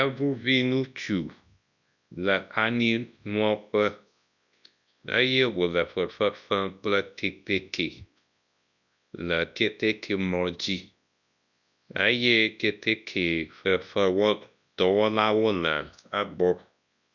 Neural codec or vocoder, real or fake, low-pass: codec, 24 kHz, 0.9 kbps, WavTokenizer, large speech release; fake; 7.2 kHz